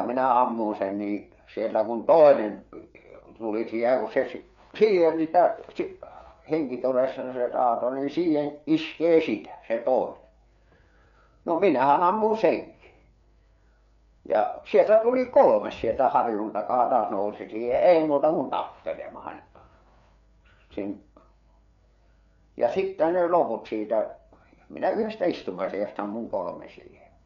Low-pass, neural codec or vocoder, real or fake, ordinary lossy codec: 7.2 kHz; codec, 16 kHz, 4 kbps, FreqCodec, larger model; fake; none